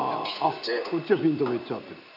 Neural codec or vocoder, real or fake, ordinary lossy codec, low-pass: none; real; none; 5.4 kHz